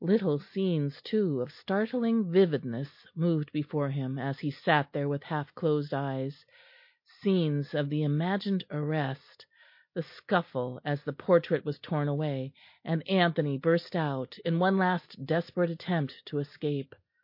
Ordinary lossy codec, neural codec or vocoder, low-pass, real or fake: MP3, 32 kbps; none; 5.4 kHz; real